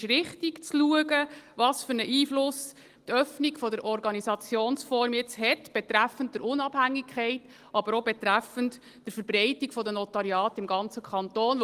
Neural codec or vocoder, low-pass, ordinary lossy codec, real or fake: none; 14.4 kHz; Opus, 24 kbps; real